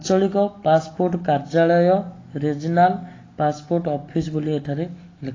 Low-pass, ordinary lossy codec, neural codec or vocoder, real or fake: 7.2 kHz; AAC, 32 kbps; none; real